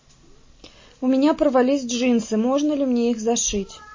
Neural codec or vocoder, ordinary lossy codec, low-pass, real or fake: none; MP3, 32 kbps; 7.2 kHz; real